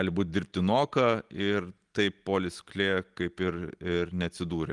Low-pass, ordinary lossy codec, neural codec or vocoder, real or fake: 10.8 kHz; Opus, 24 kbps; none; real